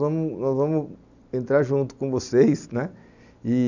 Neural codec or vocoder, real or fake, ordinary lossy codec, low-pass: none; real; none; 7.2 kHz